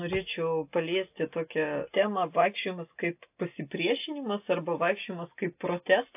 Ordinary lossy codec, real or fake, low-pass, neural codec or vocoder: AAC, 32 kbps; real; 3.6 kHz; none